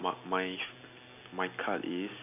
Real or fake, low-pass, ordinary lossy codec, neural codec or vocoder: real; 3.6 kHz; none; none